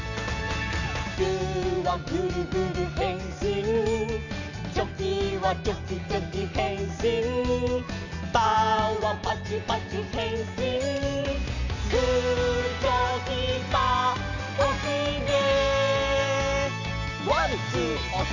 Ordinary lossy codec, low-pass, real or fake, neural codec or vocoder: none; 7.2 kHz; real; none